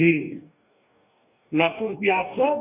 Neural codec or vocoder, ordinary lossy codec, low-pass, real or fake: codec, 44.1 kHz, 2.6 kbps, DAC; none; 3.6 kHz; fake